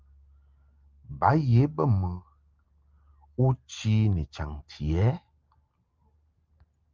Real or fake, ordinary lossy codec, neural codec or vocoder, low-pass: real; Opus, 32 kbps; none; 7.2 kHz